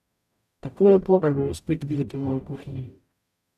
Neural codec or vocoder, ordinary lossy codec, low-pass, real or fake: codec, 44.1 kHz, 0.9 kbps, DAC; none; 14.4 kHz; fake